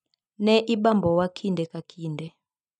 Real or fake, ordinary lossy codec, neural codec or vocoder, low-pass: real; none; none; 10.8 kHz